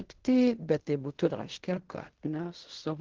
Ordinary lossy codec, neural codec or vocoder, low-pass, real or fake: Opus, 16 kbps; codec, 16 kHz in and 24 kHz out, 0.4 kbps, LongCat-Audio-Codec, fine tuned four codebook decoder; 7.2 kHz; fake